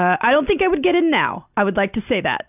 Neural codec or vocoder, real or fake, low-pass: none; real; 3.6 kHz